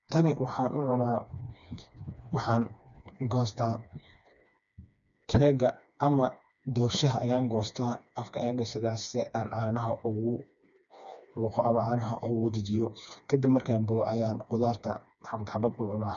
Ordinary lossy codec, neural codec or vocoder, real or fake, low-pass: none; codec, 16 kHz, 2 kbps, FreqCodec, smaller model; fake; 7.2 kHz